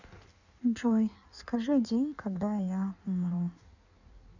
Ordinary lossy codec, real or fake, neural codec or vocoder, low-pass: none; fake; codec, 16 kHz in and 24 kHz out, 1.1 kbps, FireRedTTS-2 codec; 7.2 kHz